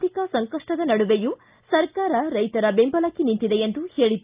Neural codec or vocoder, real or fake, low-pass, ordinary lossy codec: none; real; 3.6 kHz; Opus, 24 kbps